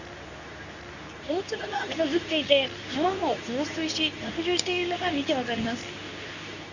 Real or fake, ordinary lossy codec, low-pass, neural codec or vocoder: fake; none; 7.2 kHz; codec, 24 kHz, 0.9 kbps, WavTokenizer, medium speech release version 2